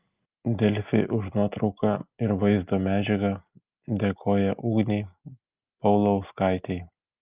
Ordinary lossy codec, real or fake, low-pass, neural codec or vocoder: Opus, 24 kbps; real; 3.6 kHz; none